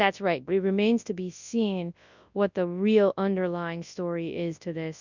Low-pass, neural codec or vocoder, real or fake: 7.2 kHz; codec, 24 kHz, 0.9 kbps, WavTokenizer, large speech release; fake